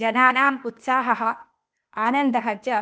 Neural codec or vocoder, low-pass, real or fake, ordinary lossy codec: codec, 16 kHz, 0.8 kbps, ZipCodec; none; fake; none